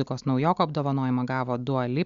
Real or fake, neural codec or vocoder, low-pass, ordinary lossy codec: real; none; 7.2 kHz; Opus, 64 kbps